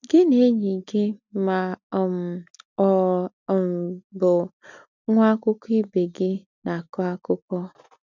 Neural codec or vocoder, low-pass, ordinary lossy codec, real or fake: none; 7.2 kHz; none; real